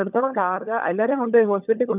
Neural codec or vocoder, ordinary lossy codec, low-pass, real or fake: codec, 16 kHz, 16 kbps, FunCodec, trained on LibriTTS, 50 frames a second; none; 3.6 kHz; fake